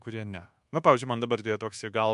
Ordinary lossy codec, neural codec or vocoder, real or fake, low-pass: MP3, 64 kbps; codec, 24 kHz, 1.2 kbps, DualCodec; fake; 10.8 kHz